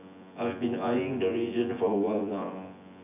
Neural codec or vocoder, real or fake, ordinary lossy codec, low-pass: vocoder, 24 kHz, 100 mel bands, Vocos; fake; none; 3.6 kHz